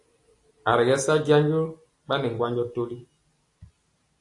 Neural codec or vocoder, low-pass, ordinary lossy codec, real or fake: none; 10.8 kHz; AAC, 48 kbps; real